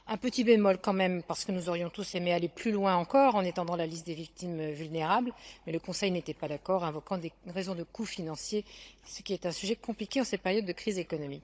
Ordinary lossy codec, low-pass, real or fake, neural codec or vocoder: none; none; fake; codec, 16 kHz, 16 kbps, FunCodec, trained on Chinese and English, 50 frames a second